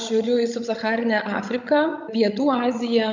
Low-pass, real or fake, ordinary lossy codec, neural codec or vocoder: 7.2 kHz; fake; MP3, 64 kbps; codec, 16 kHz, 16 kbps, FreqCodec, larger model